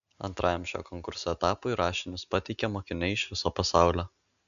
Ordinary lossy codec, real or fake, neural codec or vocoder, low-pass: MP3, 96 kbps; real; none; 7.2 kHz